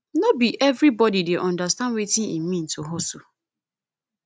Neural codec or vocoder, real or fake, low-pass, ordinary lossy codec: none; real; none; none